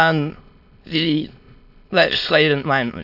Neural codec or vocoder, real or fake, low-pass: autoencoder, 22.05 kHz, a latent of 192 numbers a frame, VITS, trained on many speakers; fake; 5.4 kHz